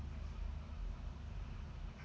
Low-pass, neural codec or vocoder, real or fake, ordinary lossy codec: none; none; real; none